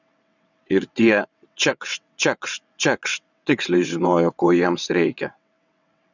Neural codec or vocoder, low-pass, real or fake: vocoder, 22.05 kHz, 80 mel bands, WaveNeXt; 7.2 kHz; fake